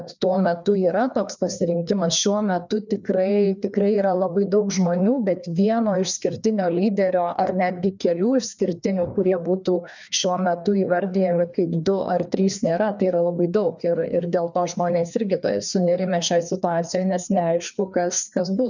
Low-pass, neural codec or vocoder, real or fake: 7.2 kHz; codec, 16 kHz, 2 kbps, FreqCodec, larger model; fake